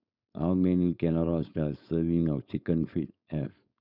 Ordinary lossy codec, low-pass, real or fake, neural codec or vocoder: none; 5.4 kHz; fake; codec, 16 kHz, 4.8 kbps, FACodec